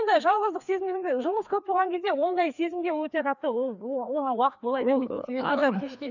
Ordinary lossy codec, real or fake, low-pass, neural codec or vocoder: none; fake; 7.2 kHz; codec, 16 kHz, 2 kbps, FreqCodec, larger model